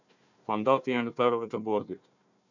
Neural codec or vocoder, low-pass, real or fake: codec, 16 kHz, 1 kbps, FunCodec, trained on Chinese and English, 50 frames a second; 7.2 kHz; fake